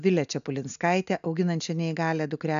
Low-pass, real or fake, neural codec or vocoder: 7.2 kHz; real; none